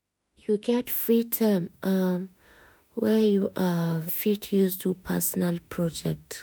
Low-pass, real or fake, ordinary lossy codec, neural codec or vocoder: none; fake; none; autoencoder, 48 kHz, 32 numbers a frame, DAC-VAE, trained on Japanese speech